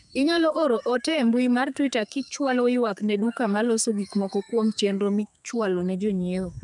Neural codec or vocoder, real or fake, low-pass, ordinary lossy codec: codec, 32 kHz, 1.9 kbps, SNAC; fake; 10.8 kHz; none